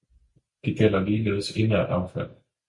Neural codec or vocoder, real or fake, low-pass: none; real; 10.8 kHz